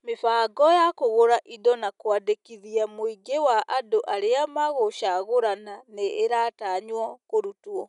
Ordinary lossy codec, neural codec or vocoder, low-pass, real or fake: none; none; 10.8 kHz; real